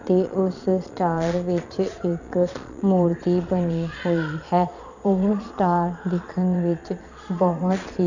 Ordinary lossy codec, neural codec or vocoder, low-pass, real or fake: none; vocoder, 22.05 kHz, 80 mel bands, Vocos; 7.2 kHz; fake